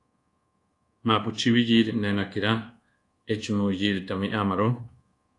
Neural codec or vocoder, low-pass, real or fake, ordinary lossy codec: codec, 24 kHz, 1.2 kbps, DualCodec; 10.8 kHz; fake; AAC, 48 kbps